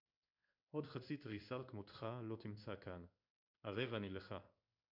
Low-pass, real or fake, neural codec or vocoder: 5.4 kHz; fake; codec, 16 kHz in and 24 kHz out, 1 kbps, XY-Tokenizer